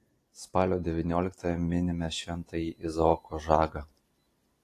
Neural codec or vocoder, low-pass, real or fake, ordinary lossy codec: none; 14.4 kHz; real; AAC, 48 kbps